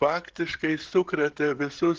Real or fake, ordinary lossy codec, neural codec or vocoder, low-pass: fake; Opus, 16 kbps; codec, 16 kHz, 16 kbps, FreqCodec, smaller model; 7.2 kHz